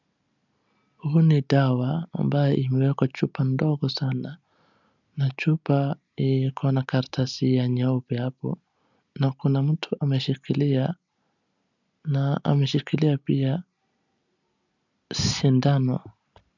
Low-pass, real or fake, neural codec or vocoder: 7.2 kHz; real; none